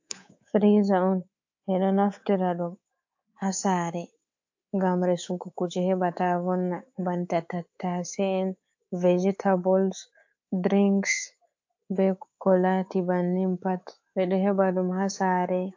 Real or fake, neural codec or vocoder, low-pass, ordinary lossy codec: fake; codec, 24 kHz, 3.1 kbps, DualCodec; 7.2 kHz; AAC, 48 kbps